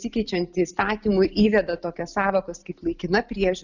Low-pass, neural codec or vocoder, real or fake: 7.2 kHz; none; real